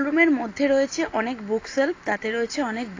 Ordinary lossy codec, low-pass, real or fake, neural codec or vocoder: AAC, 32 kbps; 7.2 kHz; real; none